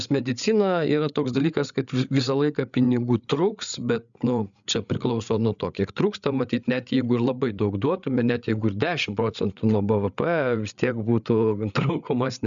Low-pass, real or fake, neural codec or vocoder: 7.2 kHz; fake; codec, 16 kHz, 8 kbps, FreqCodec, larger model